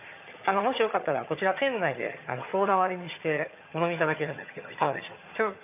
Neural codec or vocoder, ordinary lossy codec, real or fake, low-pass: vocoder, 22.05 kHz, 80 mel bands, HiFi-GAN; none; fake; 3.6 kHz